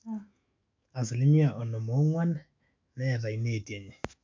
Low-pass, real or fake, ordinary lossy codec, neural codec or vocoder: 7.2 kHz; fake; MP3, 64 kbps; autoencoder, 48 kHz, 128 numbers a frame, DAC-VAE, trained on Japanese speech